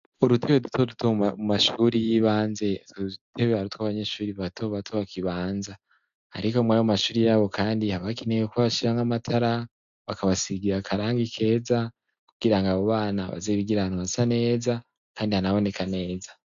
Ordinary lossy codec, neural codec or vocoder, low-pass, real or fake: MP3, 64 kbps; none; 7.2 kHz; real